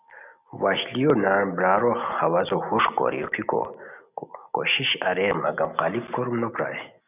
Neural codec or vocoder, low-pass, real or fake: none; 3.6 kHz; real